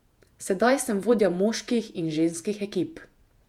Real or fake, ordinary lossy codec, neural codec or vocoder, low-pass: fake; MP3, 96 kbps; vocoder, 48 kHz, 128 mel bands, Vocos; 19.8 kHz